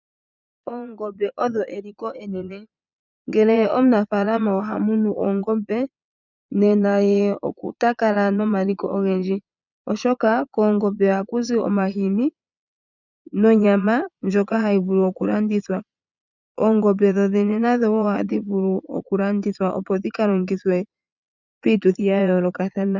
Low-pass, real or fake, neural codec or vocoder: 7.2 kHz; fake; vocoder, 22.05 kHz, 80 mel bands, Vocos